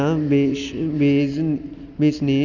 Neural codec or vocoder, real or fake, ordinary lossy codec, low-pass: none; real; none; 7.2 kHz